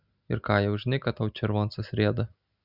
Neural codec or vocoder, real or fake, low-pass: none; real; 5.4 kHz